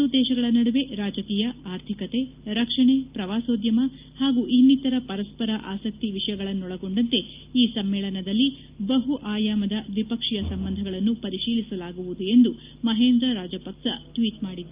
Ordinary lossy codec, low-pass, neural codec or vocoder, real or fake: Opus, 24 kbps; 3.6 kHz; none; real